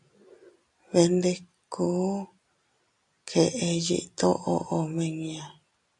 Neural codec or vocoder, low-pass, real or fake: none; 10.8 kHz; real